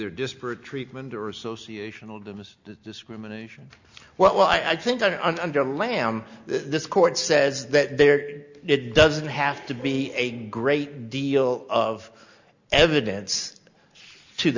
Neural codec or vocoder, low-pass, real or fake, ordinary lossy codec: none; 7.2 kHz; real; Opus, 64 kbps